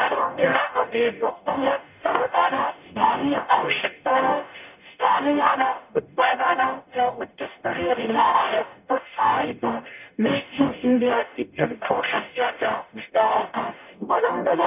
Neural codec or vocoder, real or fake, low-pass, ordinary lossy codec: codec, 44.1 kHz, 0.9 kbps, DAC; fake; 3.6 kHz; none